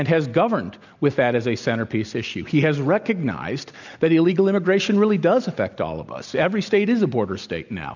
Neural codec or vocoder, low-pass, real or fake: none; 7.2 kHz; real